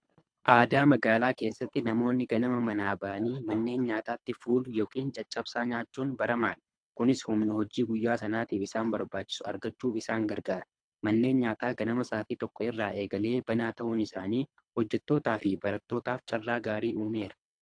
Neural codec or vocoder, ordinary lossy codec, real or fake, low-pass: codec, 24 kHz, 3 kbps, HILCodec; AAC, 64 kbps; fake; 9.9 kHz